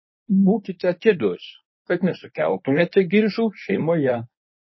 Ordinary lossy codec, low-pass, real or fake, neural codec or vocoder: MP3, 24 kbps; 7.2 kHz; fake; codec, 24 kHz, 0.9 kbps, WavTokenizer, medium speech release version 1